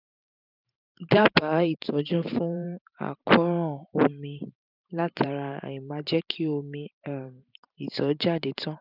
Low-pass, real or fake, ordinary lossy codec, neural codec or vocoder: 5.4 kHz; fake; none; vocoder, 44.1 kHz, 128 mel bands every 512 samples, BigVGAN v2